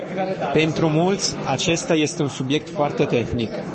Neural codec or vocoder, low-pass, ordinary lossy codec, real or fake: codec, 44.1 kHz, 7.8 kbps, Pupu-Codec; 10.8 kHz; MP3, 32 kbps; fake